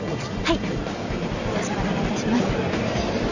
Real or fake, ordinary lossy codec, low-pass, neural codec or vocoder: fake; none; 7.2 kHz; vocoder, 44.1 kHz, 128 mel bands every 256 samples, BigVGAN v2